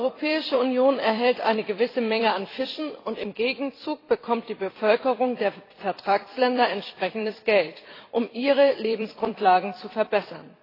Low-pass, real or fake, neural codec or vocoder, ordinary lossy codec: 5.4 kHz; fake; vocoder, 44.1 kHz, 128 mel bands every 256 samples, BigVGAN v2; AAC, 24 kbps